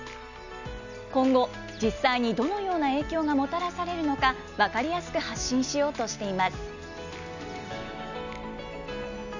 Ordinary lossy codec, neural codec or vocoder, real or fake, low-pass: none; none; real; 7.2 kHz